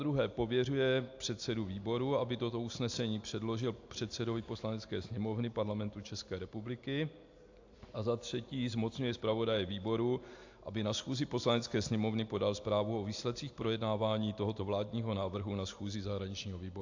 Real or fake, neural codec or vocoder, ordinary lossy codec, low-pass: real; none; AAC, 48 kbps; 7.2 kHz